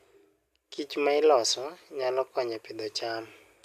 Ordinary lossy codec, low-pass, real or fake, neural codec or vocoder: none; 14.4 kHz; real; none